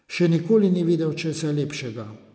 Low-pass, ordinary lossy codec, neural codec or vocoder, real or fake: none; none; none; real